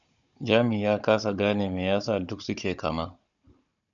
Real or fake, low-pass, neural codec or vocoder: fake; 7.2 kHz; codec, 16 kHz, 16 kbps, FunCodec, trained on Chinese and English, 50 frames a second